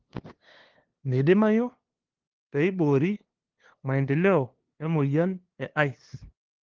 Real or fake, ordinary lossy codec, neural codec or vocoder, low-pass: fake; Opus, 16 kbps; codec, 16 kHz, 2 kbps, FunCodec, trained on LibriTTS, 25 frames a second; 7.2 kHz